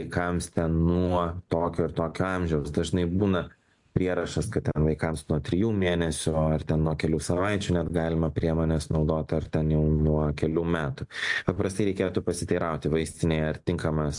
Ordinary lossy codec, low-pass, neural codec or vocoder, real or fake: AAC, 64 kbps; 10.8 kHz; none; real